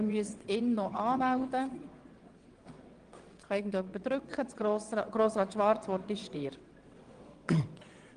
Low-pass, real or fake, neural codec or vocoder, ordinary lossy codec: 9.9 kHz; fake; vocoder, 22.05 kHz, 80 mel bands, WaveNeXt; Opus, 24 kbps